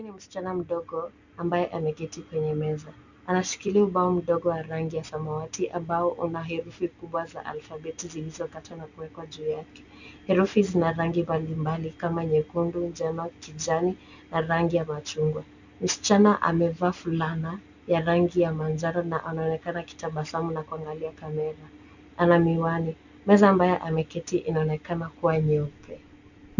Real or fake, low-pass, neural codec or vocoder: real; 7.2 kHz; none